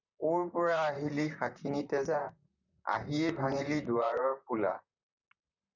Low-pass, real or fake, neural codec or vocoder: 7.2 kHz; fake; vocoder, 44.1 kHz, 128 mel bands, Pupu-Vocoder